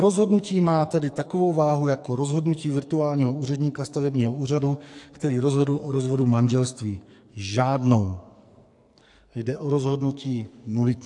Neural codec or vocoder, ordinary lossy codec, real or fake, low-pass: codec, 44.1 kHz, 2.6 kbps, SNAC; AAC, 64 kbps; fake; 10.8 kHz